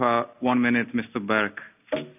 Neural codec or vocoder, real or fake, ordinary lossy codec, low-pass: none; real; none; 3.6 kHz